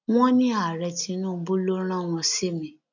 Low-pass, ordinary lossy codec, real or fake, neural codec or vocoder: 7.2 kHz; none; real; none